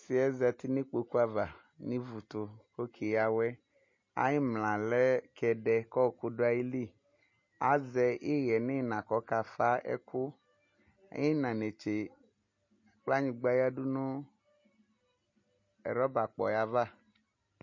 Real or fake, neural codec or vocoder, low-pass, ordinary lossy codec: real; none; 7.2 kHz; MP3, 32 kbps